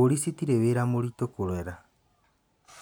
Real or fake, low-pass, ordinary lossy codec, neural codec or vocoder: real; none; none; none